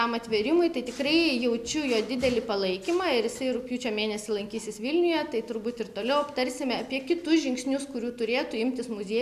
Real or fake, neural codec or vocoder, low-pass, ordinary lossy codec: real; none; 14.4 kHz; MP3, 96 kbps